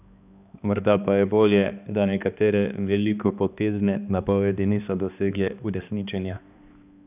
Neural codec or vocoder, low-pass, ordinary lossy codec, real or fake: codec, 16 kHz, 2 kbps, X-Codec, HuBERT features, trained on balanced general audio; 3.6 kHz; none; fake